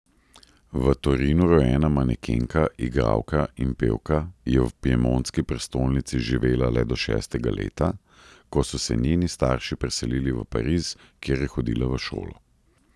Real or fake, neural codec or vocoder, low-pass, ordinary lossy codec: real; none; none; none